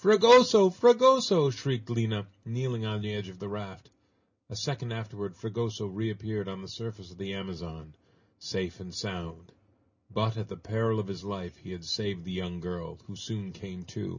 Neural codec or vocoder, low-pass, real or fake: none; 7.2 kHz; real